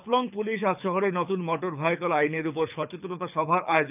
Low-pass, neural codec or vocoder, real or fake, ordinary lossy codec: 3.6 kHz; codec, 24 kHz, 6 kbps, HILCodec; fake; none